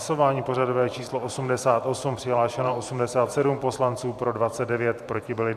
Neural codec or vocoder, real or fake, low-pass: vocoder, 44.1 kHz, 128 mel bands every 512 samples, BigVGAN v2; fake; 14.4 kHz